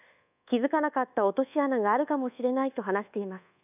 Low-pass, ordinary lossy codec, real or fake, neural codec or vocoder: 3.6 kHz; none; fake; codec, 24 kHz, 1.2 kbps, DualCodec